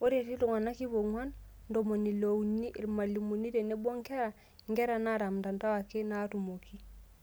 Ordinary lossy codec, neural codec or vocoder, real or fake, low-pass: none; none; real; none